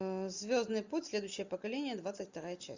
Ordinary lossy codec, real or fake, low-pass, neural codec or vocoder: Opus, 64 kbps; real; 7.2 kHz; none